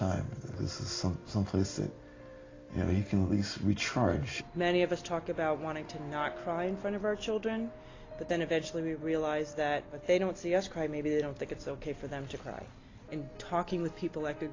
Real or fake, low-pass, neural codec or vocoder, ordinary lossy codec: real; 7.2 kHz; none; AAC, 32 kbps